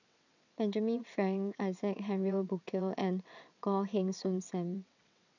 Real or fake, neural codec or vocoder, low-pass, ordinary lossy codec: fake; vocoder, 22.05 kHz, 80 mel bands, WaveNeXt; 7.2 kHz; none